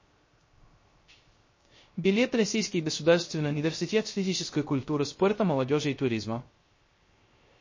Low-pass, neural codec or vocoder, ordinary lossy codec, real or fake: 7.2 kHz; codec, 16 kHz, 0.3 kbps, FocalCodec; MP3, 32 kbps; fake